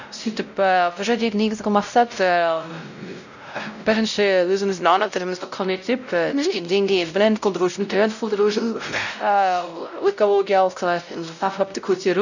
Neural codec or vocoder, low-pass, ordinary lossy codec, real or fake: codec, 16 kHz, 0.5 kbps, X-Codec, WavLM features, trained on Multilingual LibriSpeech; 7.2 kHz; none; fake